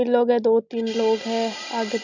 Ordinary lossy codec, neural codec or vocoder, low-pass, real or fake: MP3, 64 kbps; none; 7.2 kHz; real